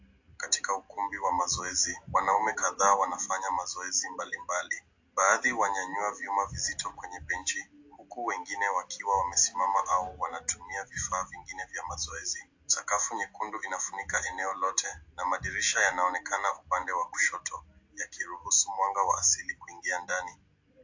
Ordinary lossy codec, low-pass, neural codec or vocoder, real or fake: AAC, 48 kbps; 7.2 kHz; none; real